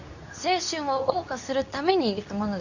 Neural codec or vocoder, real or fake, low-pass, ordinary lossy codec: codec, 24 kHz, 0.9 kbps, WavTokenizer, medium speech release version 2; fake; 7.2 kHz; none